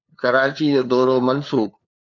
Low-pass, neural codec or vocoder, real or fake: 7.2 kHz; codec, 16 kHz, 2 kbps, FunCodec, trained on LibriTTS, 25 frames a second; fake